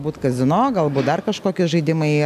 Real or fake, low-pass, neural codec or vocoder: real; 14.4 kHz; none